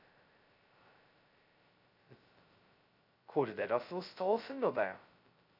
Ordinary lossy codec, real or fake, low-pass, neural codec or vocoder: none; fake; 5.4 kHz; codec, 16 kHz, 0.2 kbps, FocalCodec